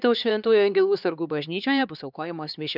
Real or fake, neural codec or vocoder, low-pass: fake; codec, 16 kHz, 2 kbps, X-Codec, HuBERT features, trained on LibriSpeech; 5.4 kHz